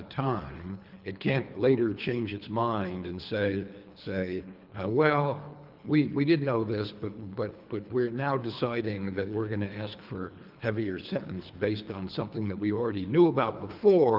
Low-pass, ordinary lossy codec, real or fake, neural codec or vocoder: 5.4 kHz; Opus, 24 kbps; fake; codec, 24 kHz, 3 kbps, HILCodec